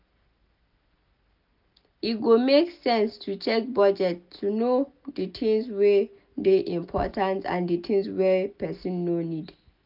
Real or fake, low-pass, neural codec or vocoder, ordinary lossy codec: real; 5.4 kHz; none; none